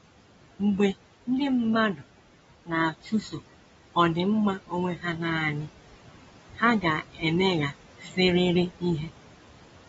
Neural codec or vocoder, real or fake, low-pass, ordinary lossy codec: none; real; 19.8 kHz; AAC, 24 kbps